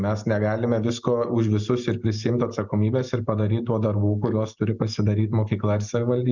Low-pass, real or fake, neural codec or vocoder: 7.2 kHz; real; none